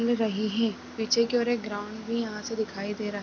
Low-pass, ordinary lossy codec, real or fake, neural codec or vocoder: none; none; real; none